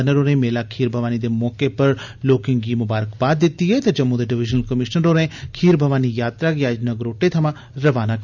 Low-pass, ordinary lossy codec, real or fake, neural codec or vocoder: 7.2 kHz; none; real; none